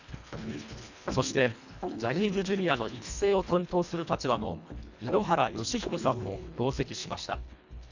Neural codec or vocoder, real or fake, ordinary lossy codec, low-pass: codec, 24 kHz, 1.5 kbps, HILCodec; fake; none; 7.2 kHz